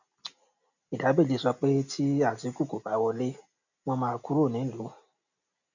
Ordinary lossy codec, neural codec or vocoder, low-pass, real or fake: none; none; 7.2 kHz; real